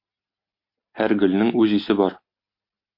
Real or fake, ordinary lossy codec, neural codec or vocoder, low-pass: real; MP3, 32 kbps; none; 5.4 kHz